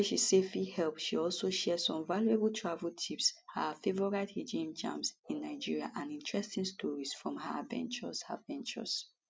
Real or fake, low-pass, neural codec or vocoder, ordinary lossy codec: real; none; none; none